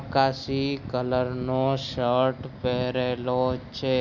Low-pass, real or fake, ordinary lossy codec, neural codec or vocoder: 7.2 kHz; real; none; none